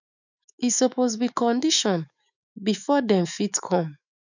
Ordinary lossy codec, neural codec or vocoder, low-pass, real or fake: none; autoencoder, 48 kHz, 128 numbers a frame, DAC-VAE, trained on Japanese speech; 7.2 kHz; fake